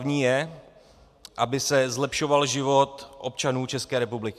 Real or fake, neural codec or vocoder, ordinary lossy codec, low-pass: real; none; MP3, 96 kbps; 14.4 kHz